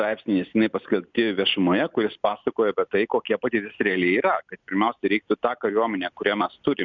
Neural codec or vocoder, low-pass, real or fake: none; 7.2 kHz; real